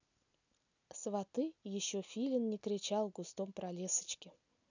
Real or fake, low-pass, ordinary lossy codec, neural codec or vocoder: real; 7.2 kHz; none; none